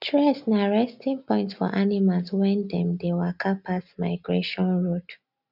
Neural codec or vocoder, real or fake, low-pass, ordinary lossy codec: none; real; 5.4 kHz; none